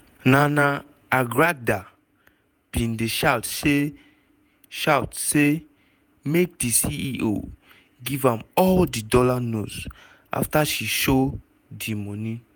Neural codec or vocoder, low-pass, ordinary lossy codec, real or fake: vocoder, 48 kHz, 128 mel bands, Vocos; none; none; fake